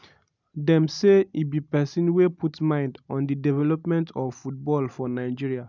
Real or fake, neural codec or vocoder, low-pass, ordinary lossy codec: real; none; 7.2 kHz; none